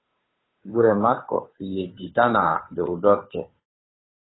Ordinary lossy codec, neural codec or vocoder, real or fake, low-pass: AAC, 16 kbps; codec, 16 kHz, 2 kbps, FunCodec, trained on Chinese and English, 25 frames a second; fake; 7.2 kHz